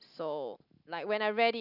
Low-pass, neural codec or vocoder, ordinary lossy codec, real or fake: 5.4 kHz; none; none; real